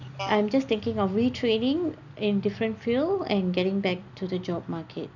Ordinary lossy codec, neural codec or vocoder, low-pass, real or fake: none; none; 7.2 kHz; real